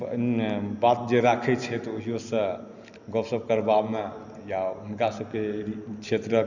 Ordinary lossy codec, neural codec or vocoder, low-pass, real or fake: none; none; 7.2 kHz; real